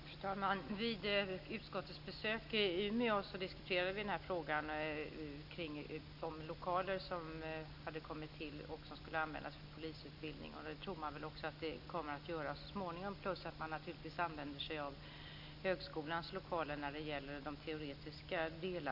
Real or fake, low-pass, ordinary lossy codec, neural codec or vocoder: real; 5.4 kHz; none; none